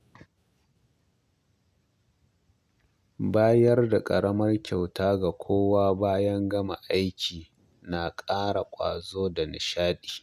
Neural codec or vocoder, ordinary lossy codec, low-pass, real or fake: none; none; 14.4 kHz; real